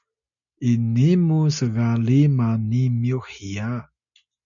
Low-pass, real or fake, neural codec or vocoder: 7.2 kHz; real; none